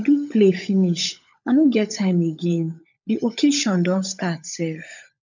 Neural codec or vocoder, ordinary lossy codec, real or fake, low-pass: codec, 16 kHz, 16 kbps, FunCodec, trained on LibriTTS, 50 frames a second; none; fake; 7.2 kHz